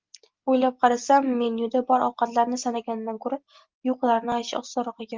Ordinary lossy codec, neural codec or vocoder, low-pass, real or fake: Opus, 32 kbps; none; 7.2 kHz; real